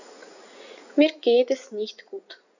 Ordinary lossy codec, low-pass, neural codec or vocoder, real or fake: none; 7.2 kHz; vocoder, 44.1 kHz, 80 mel bands, Vocos; fake